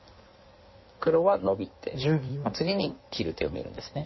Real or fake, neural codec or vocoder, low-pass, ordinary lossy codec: fake; codec, 16 kHz in and 24 kHz out, 1.1 kbps, FireRedTTS-2 codec; 7.2 kHz; MP3, 24 kbps